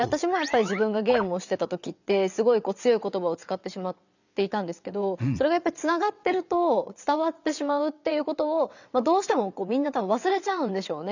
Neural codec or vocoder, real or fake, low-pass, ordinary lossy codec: vocoder, 22.05 kHz, 80 mel bands, WaveNeXt; fake; 7.2 kHz; none